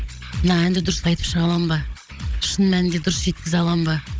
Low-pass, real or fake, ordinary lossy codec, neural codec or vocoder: none; fake; none; codec, 16 kHz, 16 kbps, FunCodec, trained on Chinese and English, 50 frames a second